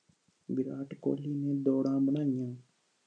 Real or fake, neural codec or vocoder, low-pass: real; none; 9.9 kHz